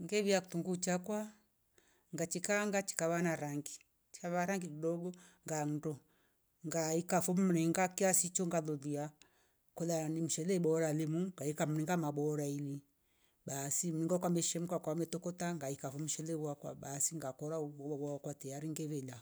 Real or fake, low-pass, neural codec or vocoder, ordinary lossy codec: real; none; none; none